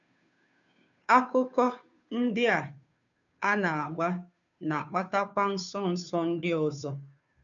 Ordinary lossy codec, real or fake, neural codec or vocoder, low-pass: none; fake; codec, 16 kHz, 2 kbps, FunCodec, trained on Chinese and English, 25 frames a second; 7.2 kHz